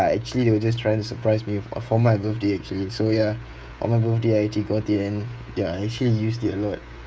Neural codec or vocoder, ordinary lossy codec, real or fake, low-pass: codec, 16 kHz, 16 kbps, FreqCodec, smaller model; none; fake; none